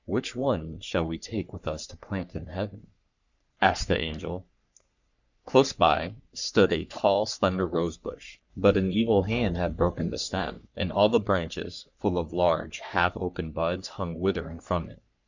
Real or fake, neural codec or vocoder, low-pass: fake; codec, 44.1 kHz, 3.4 kbps, Pupu-Codec; 7.2 kHz